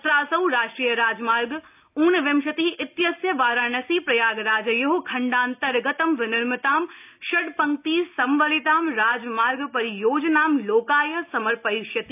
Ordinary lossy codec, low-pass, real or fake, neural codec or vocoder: AAC, 32 kbps; 3.6 kHz; real; none